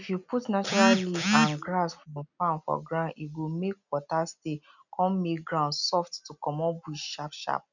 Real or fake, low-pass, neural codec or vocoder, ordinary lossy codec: real; 7.2 kHz; none; none